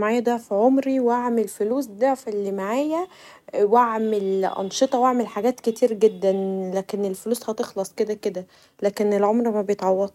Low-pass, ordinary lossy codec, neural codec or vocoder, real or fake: 19.8 kHz; none; none; real